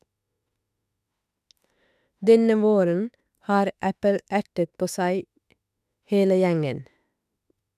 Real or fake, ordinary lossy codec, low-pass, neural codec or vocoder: fake; none; 14.4 kHz; autoencoder, 48 kHz, 32 numbers a frame, DAC-VAE, trained on Japanese speech